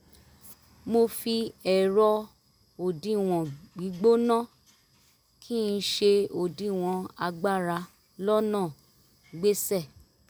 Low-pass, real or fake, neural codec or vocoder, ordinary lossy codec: none; real; none; none